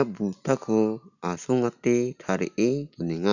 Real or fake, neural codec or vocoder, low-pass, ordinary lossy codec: real; none; 7.2 kHz; none